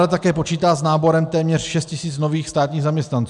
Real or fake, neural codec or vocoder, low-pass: real; none; 10.8 kHz